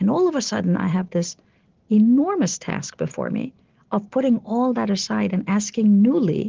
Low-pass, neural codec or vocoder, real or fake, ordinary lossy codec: 7.2 kHz; none; real; Opus, 16 kbps